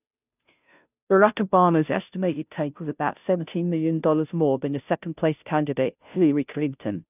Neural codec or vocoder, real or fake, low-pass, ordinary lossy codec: codec, 16 kHz, 0.5 kbps, FunCodec, trained on Chinese and English, 25 frames a second; fake; 3.6 kHz; none